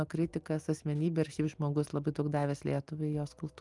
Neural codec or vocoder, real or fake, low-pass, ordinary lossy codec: none; real; 10.8 kHz; Opus, 32 kbps